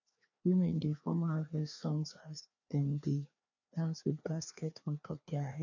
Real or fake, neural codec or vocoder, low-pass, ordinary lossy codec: fake; codec, 16 kHz, 2 kbps, FreqCodec, larger model; 7.2 kHz; none